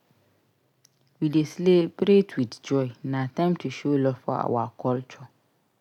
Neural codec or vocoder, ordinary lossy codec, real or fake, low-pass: none; none; real; 19.8 kHz